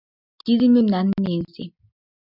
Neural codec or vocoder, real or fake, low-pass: none; real; 5.4 kHz